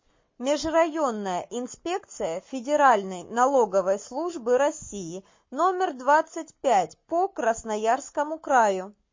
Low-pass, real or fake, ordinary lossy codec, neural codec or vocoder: 7.2 kHz; fake; MP3, 32 kbps; codec, 44.1 kHz, 7.8 kbps, Pupu-Codec